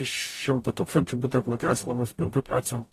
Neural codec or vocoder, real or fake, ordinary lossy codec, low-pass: codec, 44.1 kHz, 0.9 kbps, DAC; fake; AAC, 48 kbps; 14.4 kHz